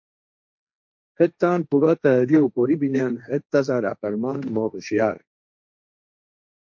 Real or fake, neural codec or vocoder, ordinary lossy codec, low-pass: fake; codec, 16 kHz, 1.1 kbps, Voila-Tokenizer; MP3, 48 kbps; 7.2 kHz